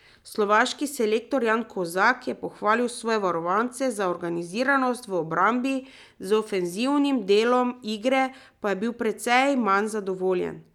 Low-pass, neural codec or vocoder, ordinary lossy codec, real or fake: 19.8 kHz; none; none; real